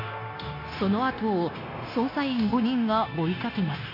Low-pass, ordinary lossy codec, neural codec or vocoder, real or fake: 5.4 kHz; AAC, 32 kbps; codec, 16 kHz, 0.9 kbps, LongCat-Audio-Codec; fake